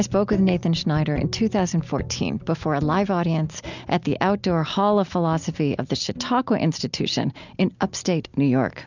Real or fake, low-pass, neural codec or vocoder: real; 7.2 kHz; none